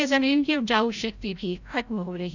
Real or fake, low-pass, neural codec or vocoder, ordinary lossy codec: fake; 7.2 kHz; codec, 16 kHz, 0.5 kbps, FreqCodec, larger model; none